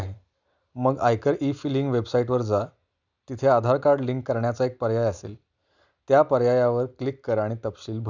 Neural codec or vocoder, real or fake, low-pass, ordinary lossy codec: none; real; 7.2 kHz; none